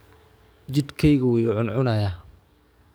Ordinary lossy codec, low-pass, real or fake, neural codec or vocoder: none; none; fake; codec, 44.1 kHz, 7.8 kbps, DAC